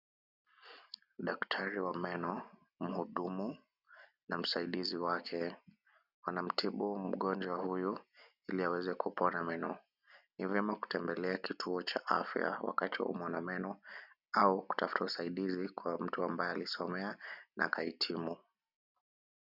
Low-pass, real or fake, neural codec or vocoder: 5.4 kHz; real; none